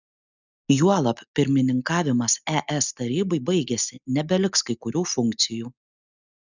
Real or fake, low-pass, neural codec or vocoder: real; 7.2 kHz; none